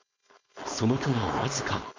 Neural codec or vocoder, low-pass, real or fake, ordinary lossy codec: codec, 16 kHz, 4.8 kbps, FACodec; 7.2 kHz; fake; MP3, 64 kbps